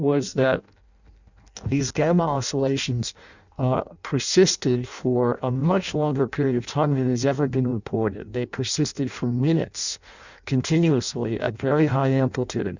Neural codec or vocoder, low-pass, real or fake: codec, 16 kHz in and 24 kHz out, 0.6 kbps, FireRedTTS-2 codec; 7.2 kHz; fake